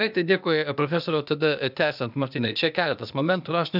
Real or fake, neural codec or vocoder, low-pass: fake; codec, 16 kHz, 0.8 kbps, ZipCodec; 5.4 kHz